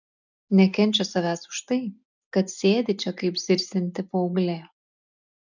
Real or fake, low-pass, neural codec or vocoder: real; 7.2 kHz; none